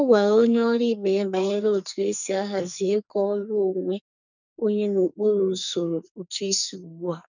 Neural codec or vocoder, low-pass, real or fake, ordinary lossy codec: codec, 44.1 kHz, 2.6 kbps, SNAC; 7.2 kHz; fake; none